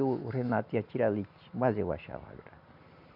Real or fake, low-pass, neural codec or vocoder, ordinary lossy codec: real; 5.4 kHz; none; none